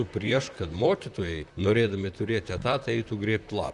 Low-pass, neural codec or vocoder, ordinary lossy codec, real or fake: 10.8 kHz; vocoder, 44.1 kHz, 128 mel bands, Pupu-Vocoder; Opus, 64 kbps; fake